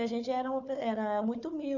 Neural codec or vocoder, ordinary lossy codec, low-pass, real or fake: codec, 16 kHz, 4 kbps, FunCodec, trained on Chinese and English, 50 frames a second; none; 7.2 kHz; fake